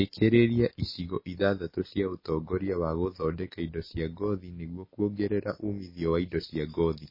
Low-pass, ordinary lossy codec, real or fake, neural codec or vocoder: 5.4 kHz; MP3, 24 kbps; real; none